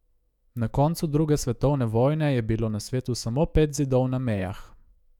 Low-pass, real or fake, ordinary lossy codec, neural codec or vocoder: 19.8 kHz; fake; none; autoencoder, 48 kHz, 128 numbers a frame, DAC-VAE, trained on Japanese speech